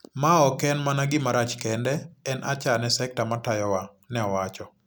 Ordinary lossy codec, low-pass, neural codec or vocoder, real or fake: none; none; none; real